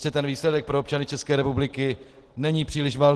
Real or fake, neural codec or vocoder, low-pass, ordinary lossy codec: real; none; 10.8 kHz; Opus, 16 kbps